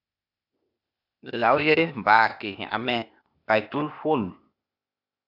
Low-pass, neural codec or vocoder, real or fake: 5.4 kHz; codec, 16 kHz, 0.8 kbps, ZipCodec; fake